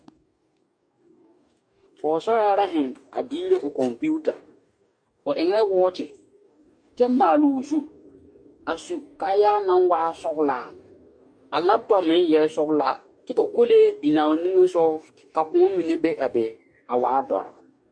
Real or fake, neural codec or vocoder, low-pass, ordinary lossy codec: fake; codec, 44.1 kHz, 2.6 kbps, DAC; 9.9 kHz; MP3, 64 kbps